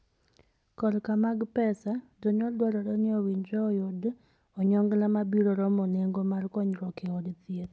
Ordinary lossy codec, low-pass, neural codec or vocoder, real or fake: none; none; none; real